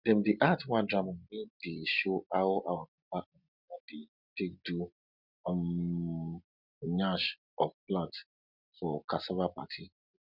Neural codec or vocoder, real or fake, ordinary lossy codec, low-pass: none; real; none; 5.4 kHz